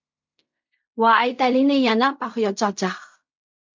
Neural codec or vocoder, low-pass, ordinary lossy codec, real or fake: codec, 16 kHz in and 24 kHz out, 0.4 kbps, LongCat-Audio-Codec, fine tuned four codebook decoder; 7.2 kHz; MP3, 64 kbps; fake